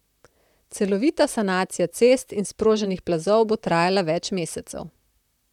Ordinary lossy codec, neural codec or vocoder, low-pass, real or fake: none; vocoder, 44.1 kHz, 128 mel bands, Pupu-Vocoder; 19.8 kHz; fake